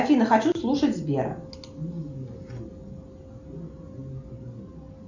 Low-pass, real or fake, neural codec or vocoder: 7.2 kHz; real; none